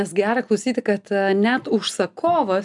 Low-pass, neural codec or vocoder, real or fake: 10.8 kHz; none; real